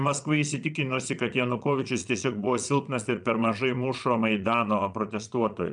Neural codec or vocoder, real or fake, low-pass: vocoder, 22.05 kHz, 80 mel bands, Vocos; fake; 9.9 kHz